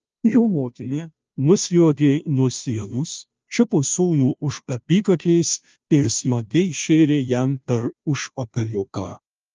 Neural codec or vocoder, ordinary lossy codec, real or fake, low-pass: codec, 16 kHz, 0.5 kbps, FunCodec, trained on Chinese and English, 25 frames a second; Opus, 24 kbps; fake; 7.2 kHz